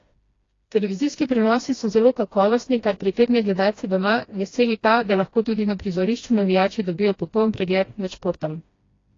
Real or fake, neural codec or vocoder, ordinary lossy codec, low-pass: fake; codec, 16 kHz, 1 kbps, FreqCodec, smaller model; AAC, 32 kbps; 7.2 kHz